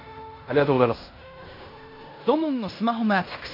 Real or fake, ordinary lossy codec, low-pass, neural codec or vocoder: fake; MP3, 32 kbps; 5.4 kHz; codec, 16 kHz in and 24 kHz out, 0.9 kbps, LongCat-Audio-Codec, fine tuned four codebook decoder